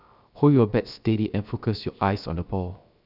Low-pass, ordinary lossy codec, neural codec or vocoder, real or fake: 5.4 kHz; none; codec, 16 kHz, 0.7 kbps, FocalCodec; fake